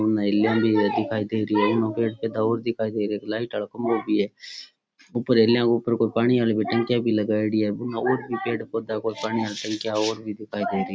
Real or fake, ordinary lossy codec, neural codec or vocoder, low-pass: real; none; none; none